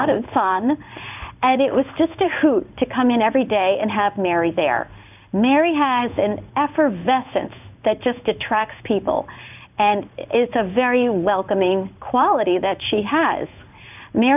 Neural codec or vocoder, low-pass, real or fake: codec, 16 kHz in and 24 kHz out, 1 kbps, XY-Tokenizer; 3.6 kHz; fake